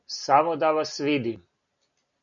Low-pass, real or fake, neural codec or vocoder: 7.2 kHz; real; none